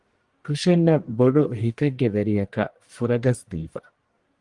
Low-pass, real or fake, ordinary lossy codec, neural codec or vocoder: 10.8 kHz; fake; Opus, 24 kbps; codec, 44.1 kHz, 1.7 kbps, Pupu-Codec